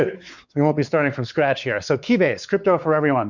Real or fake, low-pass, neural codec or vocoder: fake; 7.2 kHz; codec, 16 kHz, 4 kbps, X-Codec, WavLM features, trained on Multilingual LibriSpeech